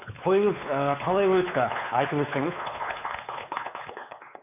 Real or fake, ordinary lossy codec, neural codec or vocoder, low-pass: fake; none; codec, 16 kHz, 4 kbps, X-Codec, WavLM features, trained on Multilingual LibriSpeech; 3.6 kHz